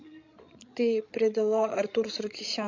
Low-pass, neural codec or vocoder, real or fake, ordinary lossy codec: 7.2 kHz; codec, 16 kHz, 16 kbps, FreqCodec, larger model; fake; MP3, 48 kbps